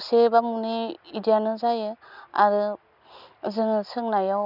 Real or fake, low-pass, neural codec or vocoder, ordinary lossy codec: real; 5.4 kHz; none; none